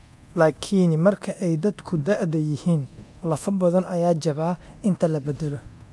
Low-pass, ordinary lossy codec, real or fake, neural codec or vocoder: none; none; fake; codec, 24 kHz, 0.9 kbps, DualCodec